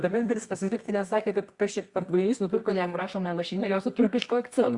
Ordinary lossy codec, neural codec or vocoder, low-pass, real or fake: Opus, 64 kbps; codec, 24 kHz, 0.9 kbps, WavTokenizer, medium music audio release; 10.8 kHz; fake